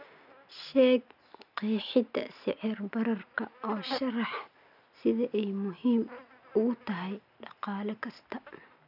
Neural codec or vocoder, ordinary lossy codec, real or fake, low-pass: none; none; real; 5.4 kHz